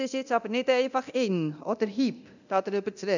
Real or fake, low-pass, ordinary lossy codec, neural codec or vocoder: fake; 7.2 kHz; none; codec, 24 kHz, 0.9 kbps, DualCodec